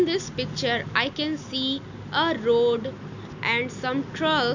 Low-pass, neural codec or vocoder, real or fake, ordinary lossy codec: 7.2 kHz; none; real; none